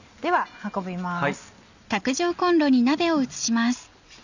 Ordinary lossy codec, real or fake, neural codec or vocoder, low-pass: none; real; none; 7.2 kHz